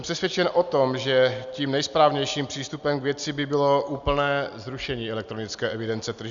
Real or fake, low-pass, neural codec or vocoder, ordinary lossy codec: real; 7.2 kHz; none; Opus, 64 kbps